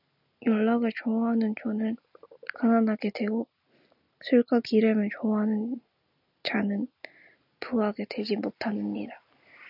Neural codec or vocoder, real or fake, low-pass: none; real; 5.4 kHz